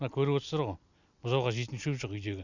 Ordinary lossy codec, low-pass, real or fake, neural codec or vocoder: none; 7.2 kHz; real; none